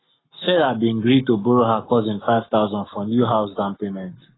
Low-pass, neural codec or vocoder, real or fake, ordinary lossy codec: 7.2 kHz; codec, 44.1 kHz, 7.8 kbps, Pupu-Codec; fake; AAC, 16 kbps